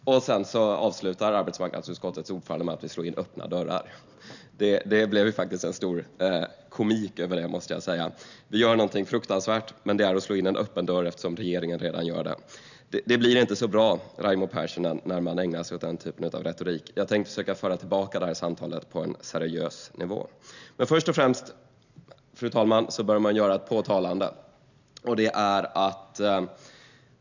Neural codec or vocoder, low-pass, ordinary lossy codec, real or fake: none; 7.2 kHz; none; real